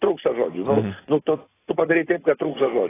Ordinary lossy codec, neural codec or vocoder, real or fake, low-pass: AAC, 16 kbps; vocoder, 44.1 kHz, 128 mel bands every 256 samples, BigVGAN v2; fake; 3.6 kHz